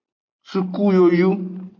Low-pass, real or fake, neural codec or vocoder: 7.2 kHz; real; none